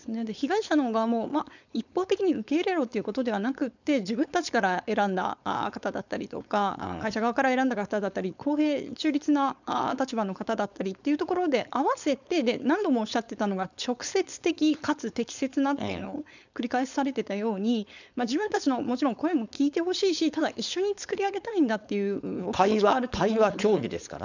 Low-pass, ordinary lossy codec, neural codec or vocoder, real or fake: 7.2 kHz; none; codec, 16 kHz, 4.8 kbps, FACodec; fake